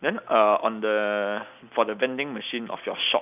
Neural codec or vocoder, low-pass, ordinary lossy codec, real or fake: none; 3.6 kHz; none; real